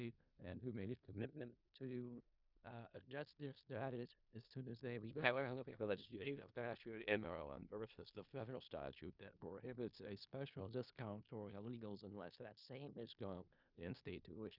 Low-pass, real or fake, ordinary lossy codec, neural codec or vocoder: 5.4 kHz; fake; Opus, 64 kbps; codec, 16 kHz in and 24 kHz out, 0.4 kbps, LongCat-Audio-Codec, four codebook decoder